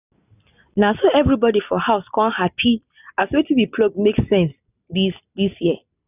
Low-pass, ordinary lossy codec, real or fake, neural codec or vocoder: 3.6 kHz; none; real; none